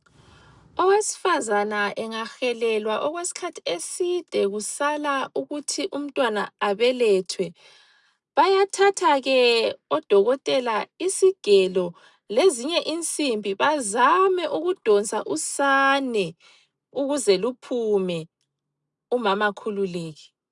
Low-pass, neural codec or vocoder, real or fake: 10.8 kHz; none; real